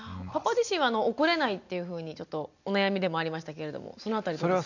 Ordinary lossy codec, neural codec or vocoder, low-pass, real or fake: none; none; 7.2 kHz; real